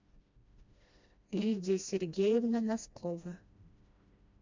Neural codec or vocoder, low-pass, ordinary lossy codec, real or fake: codec, 16 kHz, 1 kbps, FreqCodec, smaller model; 7.2 kHz; MP3, 64 kbps; fake